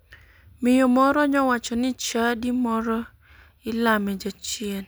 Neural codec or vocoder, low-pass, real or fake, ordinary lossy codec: none; none; real; none